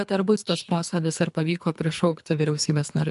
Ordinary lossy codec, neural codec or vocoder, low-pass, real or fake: MP3, 96 kbps; codec, 24 kHz, 3 kbps, HILCodec; 10.8 kHz; fake